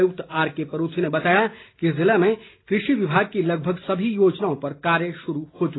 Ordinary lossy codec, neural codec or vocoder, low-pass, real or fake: AAC, 16 kbps; none; 7.2 kHz; real